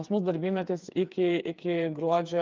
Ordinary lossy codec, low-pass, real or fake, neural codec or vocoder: Opus, 24 kbps; 7.2 kHz; fake; codec, 16 kHz, 4 kbps, FreqCodec, smaller model